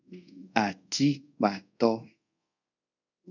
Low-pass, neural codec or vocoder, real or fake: 7.2 kHz; codec, 24 kHz, 0.5 kbps, DualCodec; fake